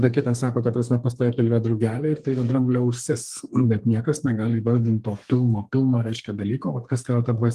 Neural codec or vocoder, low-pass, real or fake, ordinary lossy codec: codec, 32 kHz, 1.9 kbps, SNAC; 14.4 kHz; fake; Opus, 24 kbps